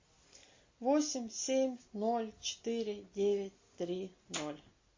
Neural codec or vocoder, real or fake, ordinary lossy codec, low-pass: none; real; MP3, 32 kbps; 7.2 kHz